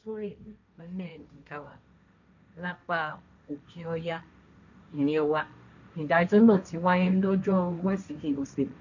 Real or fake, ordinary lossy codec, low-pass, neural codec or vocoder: fake; none; 7.2 kHz; codec, 16 kHz, 1.1 kbps, Voila-Tokenizer